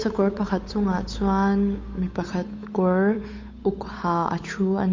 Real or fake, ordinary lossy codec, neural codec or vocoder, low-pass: fake; MP3, 48 kbps; codec, 16 kHz, 8 kbps, FunCodec, trained on Chinese and English, 25 frames a second; 7.2 kHz